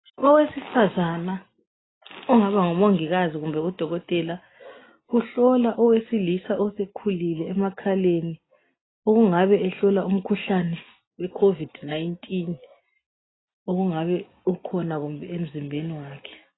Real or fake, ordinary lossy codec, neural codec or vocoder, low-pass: real; AAC, 16 kbps; none; 7.2 kHz